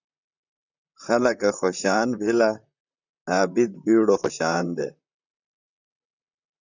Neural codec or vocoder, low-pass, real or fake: vocoder, 44.1 kHz, 128 mel bands, Pupu-Vocoder; 7.2 kHz; fake